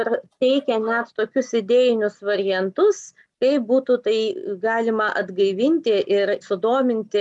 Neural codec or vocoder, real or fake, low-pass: none; real; 10.8 kHz